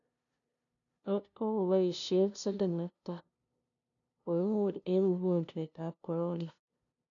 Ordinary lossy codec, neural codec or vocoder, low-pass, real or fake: none; codec, 16 kHz, 0.5 kbps, FunCodec, trained on LibriTTS, 25 frames a second; 7.2 kHz; fake